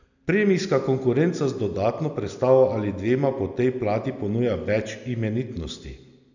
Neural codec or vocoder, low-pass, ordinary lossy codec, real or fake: none; 7.2 kHz; none; real